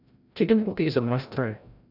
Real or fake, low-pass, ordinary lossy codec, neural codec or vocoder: fake; 5.4 kHz; none; codec, 16 kHz, 0.5 kbps, FreqCodec, larger model